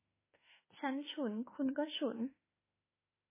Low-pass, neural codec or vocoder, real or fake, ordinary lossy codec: 3.6 kHz; autoencoder, 48 kHz, 32 numbers a frame, DAC-VAE, trained on Japanese speech; fake; MP3, 16 kbps